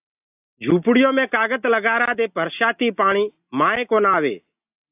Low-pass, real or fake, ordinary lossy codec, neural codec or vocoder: 3.6 kHz; real; AAC, 32 kbps; none